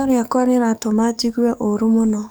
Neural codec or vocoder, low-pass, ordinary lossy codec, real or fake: codec, 44.1 kHz, 7.8 kbps, DAC; none; none; fake